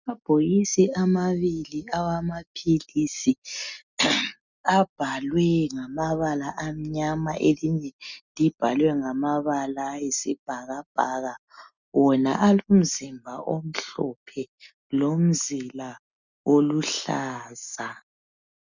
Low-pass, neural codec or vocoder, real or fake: 7.2 kHz; none; real